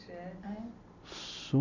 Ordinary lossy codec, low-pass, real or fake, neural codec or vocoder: none; 7.2 kHz; real; none